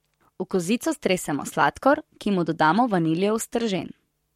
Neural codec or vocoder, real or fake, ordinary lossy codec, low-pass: codec, 44.1 kHz, 7.8 kbps, Pupu-Codec; fake; MP3, 64 kbps; 19.8 kHz